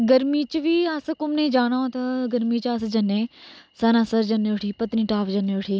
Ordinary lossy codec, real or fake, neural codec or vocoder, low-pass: none; real; none; none